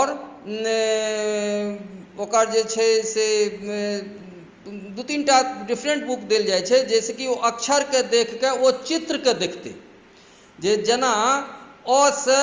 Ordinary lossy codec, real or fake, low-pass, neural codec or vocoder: Opus, 32 kbps; real; 7.2 kHz; none